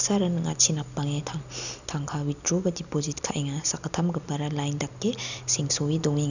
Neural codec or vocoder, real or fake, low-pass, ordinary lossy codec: none; real; 7.2 kHz; none